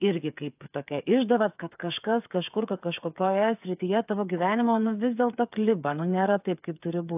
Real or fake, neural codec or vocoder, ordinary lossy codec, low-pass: fake; codec, 16 kHz, 8 kbps, FreqCodec, smaller model; AAC, 32 kbps; 3.6 kHz